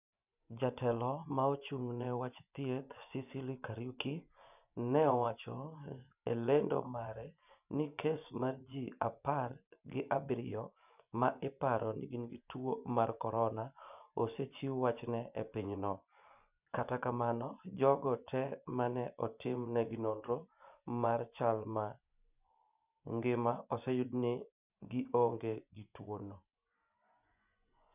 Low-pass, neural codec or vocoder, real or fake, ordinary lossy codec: 3.6 kHz; vocoder, 24 kHz, 100 mel bands, Vocos; fake; none